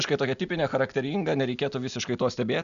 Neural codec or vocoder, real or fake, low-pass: none; real; 7.2 kHz